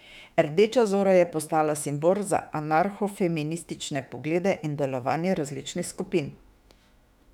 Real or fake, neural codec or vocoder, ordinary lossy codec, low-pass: fake; autoencoder, 48 kHz, 32 numbers a frame, DAC-VAE, trained on Japanese speech; none; 19.8 kHz